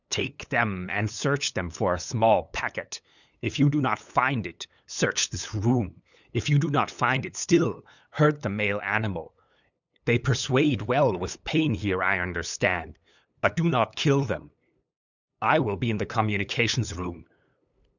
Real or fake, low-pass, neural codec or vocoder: fake; 7.2 kHz; codec, 16 kHz, 8 kbps, FunCodec, trained on LibriTTS, 25 frames a second